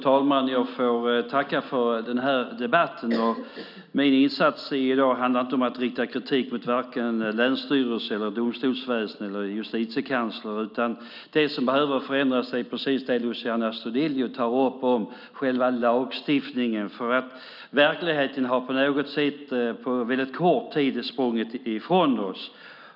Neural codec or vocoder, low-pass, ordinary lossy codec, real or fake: none; 5.4 kHz; none; real